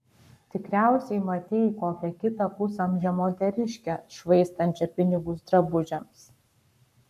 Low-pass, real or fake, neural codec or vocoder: 14.4 kHz; fake; codec, 44.1 kHz, 7.8 kbps, Pupu-Codec